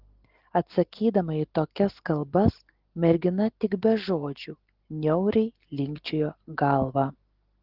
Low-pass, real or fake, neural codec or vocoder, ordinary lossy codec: 5.4 kHz; real; none; Opus, 16 kbps